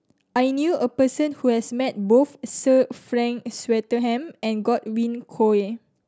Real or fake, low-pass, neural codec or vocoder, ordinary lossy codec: real; none; none; none